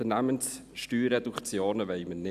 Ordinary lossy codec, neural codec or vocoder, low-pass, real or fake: none; none; 14.4 kHz; real